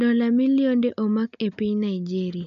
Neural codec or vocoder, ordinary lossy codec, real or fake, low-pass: none; none; real; 7.2 kHz